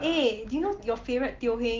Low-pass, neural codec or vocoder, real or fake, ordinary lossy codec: 7.2 kHz; none; real; Opus, 16 kbps